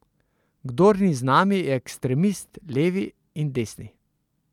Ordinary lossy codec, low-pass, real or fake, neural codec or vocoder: none; 19.8 kHz; real; none